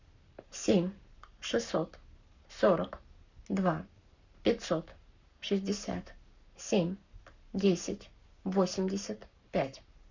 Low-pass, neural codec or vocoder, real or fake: 7.2 kHz; codec, 44.1 kHz, 7.8 kbps, Pupu-Codec; fake